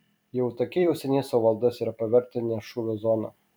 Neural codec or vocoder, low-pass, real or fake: vocoder, 44.1 kHz, 128 mel bands every 256 samples, BigVGAN v2; 19.8 kHz; fake